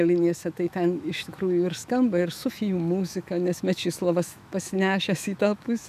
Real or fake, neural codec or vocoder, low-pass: fake; autoencoder, 48 kHz, 128 numbers a frame, DAC-VAE, trained on Japanese speech; 14.4 kHz